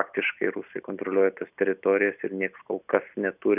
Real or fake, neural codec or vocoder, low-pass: real; none; 3.6 kHz